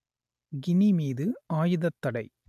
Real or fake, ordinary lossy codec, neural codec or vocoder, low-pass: real; AAC, 96 kbps; none; 14.4 kHz